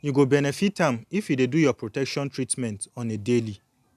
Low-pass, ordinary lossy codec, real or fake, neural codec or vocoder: 14.4 kHz; none; real; none